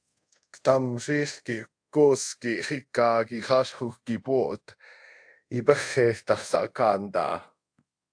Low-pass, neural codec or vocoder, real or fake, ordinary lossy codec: 9.9 kHz; codec, 24 kHz, 0.5 kbps, DualCodec; fake; AAC, 64 kbps